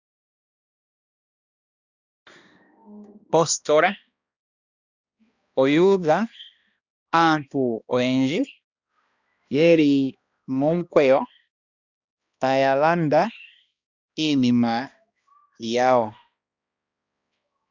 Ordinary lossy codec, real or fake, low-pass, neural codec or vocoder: Opus, 64 kbps; fake; 7.2 kHz; codec, 16 kHz, 1 kbps, X-Codec, HuBERT features, trained on balanced general audio